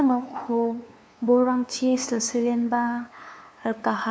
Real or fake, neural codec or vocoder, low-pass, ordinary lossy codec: fake; codec, 16 kHz, 2 kbps, FunCodec, trained on LibriTTS, 25 frames a second; none; none